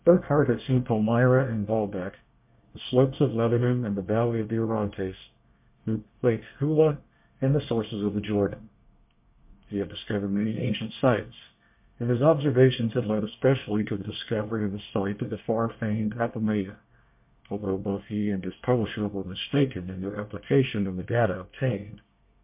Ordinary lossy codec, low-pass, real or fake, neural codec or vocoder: MP3, 32 kbps; 3.6 kHz; fake; codec, 24 kHz, 1 kbps, SNAC